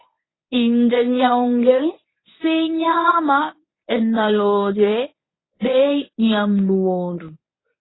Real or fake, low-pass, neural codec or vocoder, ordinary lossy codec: fake; 7.2 kHz; codec, 24 kHz, 0.9 kbps, WavTokenizer, medium speech release version 1; AAC, 16 kbps